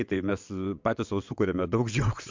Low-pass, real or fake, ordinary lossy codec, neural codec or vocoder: 7.2 kHz; fake; MP3, 64 kbps; vocoder, 22.05 kHz, 80 mel bands, WaveNeXt